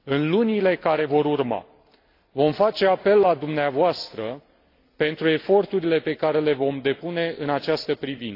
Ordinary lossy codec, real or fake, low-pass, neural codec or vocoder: AAC, 32 kbps; real; 5.4 kHz; none